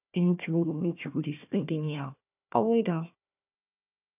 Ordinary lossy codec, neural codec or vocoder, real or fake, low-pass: none; codec, 16 kHz, 1 kbps, FunCodec, trained on Chinese and English, 50 frames a second; fake; 3.6 kHz